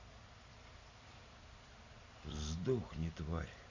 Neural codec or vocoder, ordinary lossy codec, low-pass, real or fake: none; Opus, 64 kbps; 7.2 kHz; real